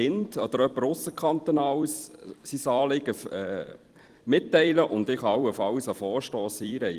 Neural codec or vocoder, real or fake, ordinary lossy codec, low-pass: vocoder, 44.1 kHz, 128 mel bands every 256 samples, BigVGAN v2; fake; Opus, 24 kbps; 14.4 kHz